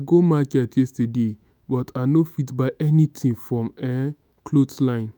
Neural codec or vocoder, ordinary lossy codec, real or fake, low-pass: autoencoder, 48 kHz, 128 numbers a frame, DAC-VAE, trained on Japanese speech; none; fake; none